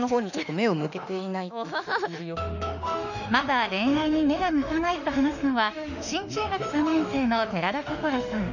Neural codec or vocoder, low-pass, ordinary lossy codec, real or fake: autoencoder, 48 kHz, 32 numbers a frame, DAC-VAE, trained on Japanese speech; 7.2 kHz; none; fake